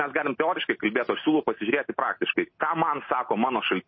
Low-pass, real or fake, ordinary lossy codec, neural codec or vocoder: 7.2 kHz; real; MP3, 24 kbps; none